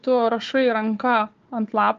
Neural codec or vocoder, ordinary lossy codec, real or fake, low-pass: codec, 16 kHz, 4 kbps, FunCodec, trained on Chinese and English, 50 frames a second; Opus, 32 kbps; fake; 7.2 kHz